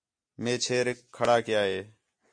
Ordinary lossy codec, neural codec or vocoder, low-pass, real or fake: MP3, 48 kbps; none; 9.9 kHz; real